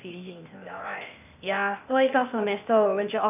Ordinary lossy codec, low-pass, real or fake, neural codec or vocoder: none; 3.6 kHz; fake; codec, 16 kHz, 0.8 kbps, ZipCodec